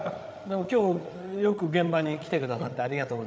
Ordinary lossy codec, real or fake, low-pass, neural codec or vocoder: none; fake; none; codec, 16 kHz, 8 kbps, FreqCodec, larger model